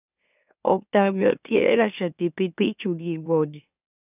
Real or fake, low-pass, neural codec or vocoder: fake; 3.6 kHz; autoencoder, 44.1 kHz, a latent of 192 numbers a frame, MeloTTS